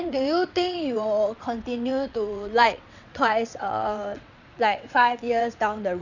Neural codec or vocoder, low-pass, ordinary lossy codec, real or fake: vocoder, 22.05 kHz, 80 mel bands, WaveNeXt; 7.2 kHz; none; fake